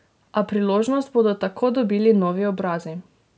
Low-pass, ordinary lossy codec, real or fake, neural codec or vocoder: none; none; real; none